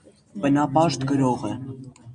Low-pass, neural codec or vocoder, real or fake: 9.9 kHz; none; real